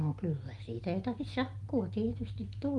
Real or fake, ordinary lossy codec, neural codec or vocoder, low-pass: real; Opus, 24 kbps; none; 10.8 kHz